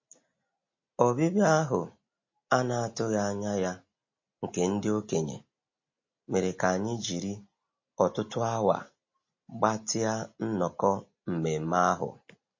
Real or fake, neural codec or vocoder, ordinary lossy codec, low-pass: real; none; MP3, 32 kbps; 7.2 kHz